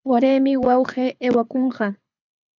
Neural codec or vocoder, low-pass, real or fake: codec, 24 kHz, 6 kbps, HILCodec; 7.2 kHz; fake